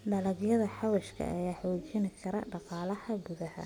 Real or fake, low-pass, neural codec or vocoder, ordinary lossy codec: real; 19.8 kHz; none; none